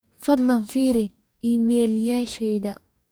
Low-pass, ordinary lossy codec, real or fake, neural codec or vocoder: none; none; fake; codec, 44.1 kHz, 2.6 kbps, DAC